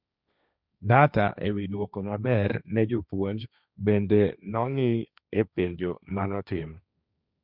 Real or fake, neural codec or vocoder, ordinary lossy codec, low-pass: fake; codec, 16 kHz, 1.1 kbps, Voila-Tokenizer; none; 5.4 kHz